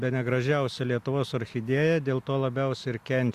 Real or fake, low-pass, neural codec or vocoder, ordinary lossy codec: real; 14.4 kHz; none; Opus, 64 kbps